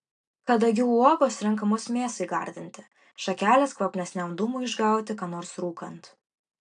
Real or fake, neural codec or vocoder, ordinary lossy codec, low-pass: real; none; AAC, 64 kbps; 9.9 kHz